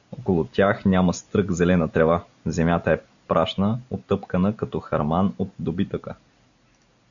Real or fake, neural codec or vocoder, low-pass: real; none; 7.2 kHz